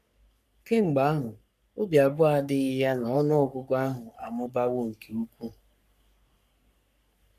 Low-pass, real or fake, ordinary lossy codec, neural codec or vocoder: 14.4 kHz; fake; none; codec, 44.1 kHz, 3.4 kbps, Pupu-Codec